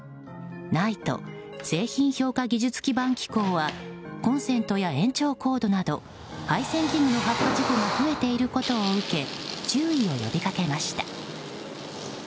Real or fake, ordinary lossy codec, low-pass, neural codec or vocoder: real; none; none; none